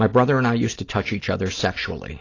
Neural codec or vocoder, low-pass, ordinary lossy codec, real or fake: none; 7.2 kHz; AAC, 32 kbps; real